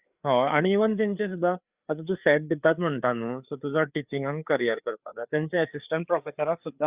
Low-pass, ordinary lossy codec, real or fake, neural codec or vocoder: 3.6 kHz; Opus, 64 kbps; fake; codec, 16 kHz, 4 kbps, FreqCodec, larger model